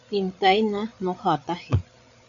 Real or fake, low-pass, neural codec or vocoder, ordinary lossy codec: fake; 7.2 kHz; codec, 16 kHz, 8 kbps, FreqCodec, larger model; MP3, 96 kbps